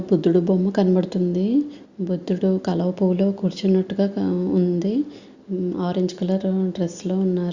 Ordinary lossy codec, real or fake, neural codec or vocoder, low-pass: Opus, 64 kbps; real; none; 7.2 kHz